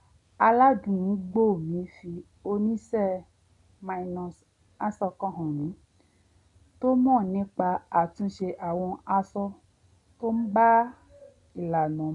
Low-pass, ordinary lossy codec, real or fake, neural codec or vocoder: 10.8 kHz; none; real; none